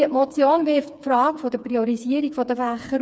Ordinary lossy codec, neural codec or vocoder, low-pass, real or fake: none; codec, 16 kHz, 4 kbps, FreqCodec, smaller model; none; fake